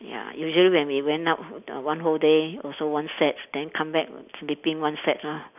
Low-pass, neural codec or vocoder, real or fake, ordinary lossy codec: 3.6 kHz; none; real; none